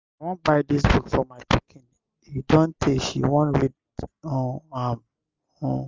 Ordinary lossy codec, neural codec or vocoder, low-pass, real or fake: Opus, 32 kbps; none; 7.2 kHz; real